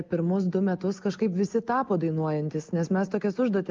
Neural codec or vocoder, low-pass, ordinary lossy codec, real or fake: none; 7.2 kHz; Opus, 32 kbps; real